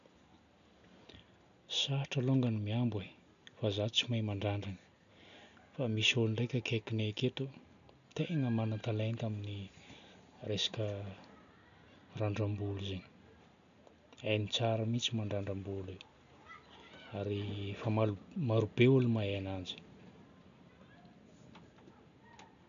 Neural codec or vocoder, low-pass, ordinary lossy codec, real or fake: none; 7.2 kHz; none; real